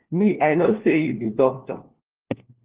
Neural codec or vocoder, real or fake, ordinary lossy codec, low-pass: codec, 16 kHz, 1 kbps, FunCodec, trained on LibriTTS, 50 frames a second; fake; Opus, 16 kbps; 3.6 kHz